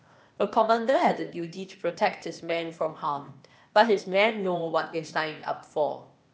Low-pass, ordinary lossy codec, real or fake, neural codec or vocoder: none; none; fake; codec, 16 kHz, 0.8 kbps, ZipCodec